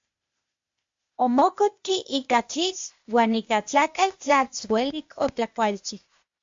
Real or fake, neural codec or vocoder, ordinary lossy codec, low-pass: fake; codec, 16 kHz, 0.8 kbps, ZipCodec; MP3, 48 kbps; 7.2 kHz